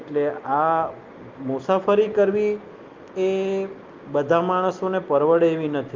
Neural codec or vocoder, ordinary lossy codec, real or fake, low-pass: none; Opus, 32 kbps; real; 7.2 kHz